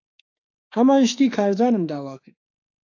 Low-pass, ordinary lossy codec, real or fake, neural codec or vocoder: 7.2 kHz; AAC, 48 kbps; fake; autoencoder, 48 kHz, 32 numbers a frame, DAC-VAE, trained on Japanese speech